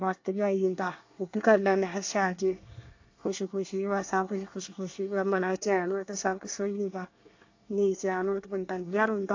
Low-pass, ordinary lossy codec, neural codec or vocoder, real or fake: 7.2 kHz; AAC, 48 kbps; codec, 24 kHz, 1 kbps, SNAC; fake